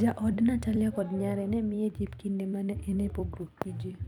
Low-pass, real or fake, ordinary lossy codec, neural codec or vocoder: 19.8 kHz; fake; none; vocoder, 44.1 kHz, 128 mel bands every 256 samples, BigVGAN v2